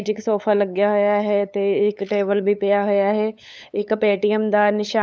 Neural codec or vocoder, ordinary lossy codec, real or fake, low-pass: codec, 16 kHz, 8 kbps, FunCodec, trained on LibriTTS, 25 frames a second; none; fake; none